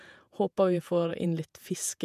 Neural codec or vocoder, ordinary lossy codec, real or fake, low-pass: vocoder, 48 kHz, 128 mel bands, Vocos; none; fake; 14.4 kHz